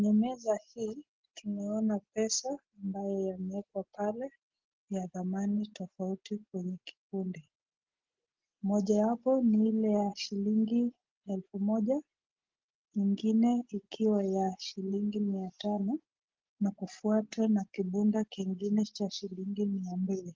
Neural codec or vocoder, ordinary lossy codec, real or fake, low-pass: none; Opus, 16 kbps; real; 7.2 kHz